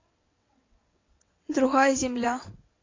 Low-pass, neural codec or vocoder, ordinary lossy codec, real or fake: 7.2 kHz; vocoder, 22.05 kHz, 80 mel bands, WaveNeXt; AAC, 32 kbps; fake